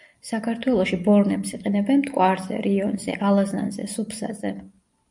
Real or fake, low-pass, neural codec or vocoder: real; 10.8 kHz; none